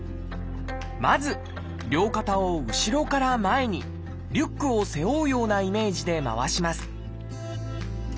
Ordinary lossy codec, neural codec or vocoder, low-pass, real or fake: none; none; none; real